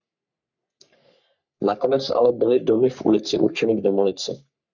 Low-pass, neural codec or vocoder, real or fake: 7.2 kHz; codec, 44.1 kHz, 3.4 kbps, Pupu-Codec; fake